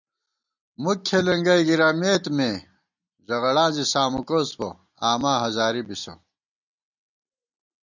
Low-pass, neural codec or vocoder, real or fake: 7.2 kHz; none; real